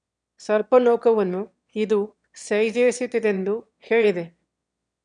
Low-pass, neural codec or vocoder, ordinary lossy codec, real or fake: 9.9 kHz; autoencoder, 22.05 kHz, a latent of 192 numbers a frame, VITS, trained on one speaker; none; fake